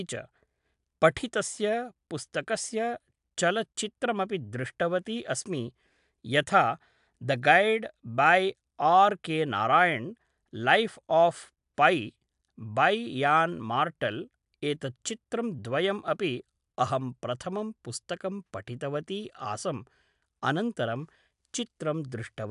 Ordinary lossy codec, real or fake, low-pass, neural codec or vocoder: AAC, 96 kbps; real; 10.8 kHz; none